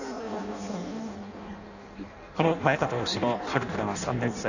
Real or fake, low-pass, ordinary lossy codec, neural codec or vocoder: fake; 7.2 kHz; none; codec, 16 kHz in and 24 kHz out, 0.6 kbps, FireRedTTS-2 codec